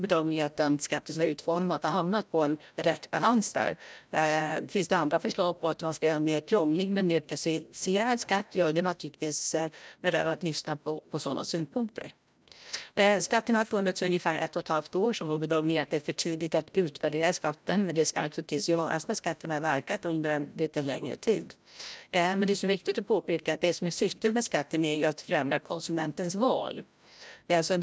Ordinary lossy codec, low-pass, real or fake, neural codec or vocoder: none; none; fake; codec, 16 kHz, 0.5 kbps, FreqCodec, larger model